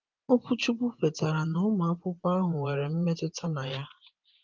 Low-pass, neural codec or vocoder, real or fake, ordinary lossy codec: 7.2 kHz; vocoder, 24 kHz, 100 mel bands, Vocos; fake; Opus, 32 kbps